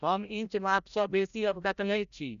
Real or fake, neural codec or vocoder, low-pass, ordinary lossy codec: fake; codec, 16 kHz, 0.5 kbps, FreqCodec, larger model; 7.2 kHz; none